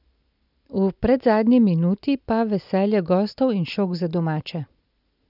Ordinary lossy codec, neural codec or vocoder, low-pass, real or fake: none; none; 5.4 kHz; real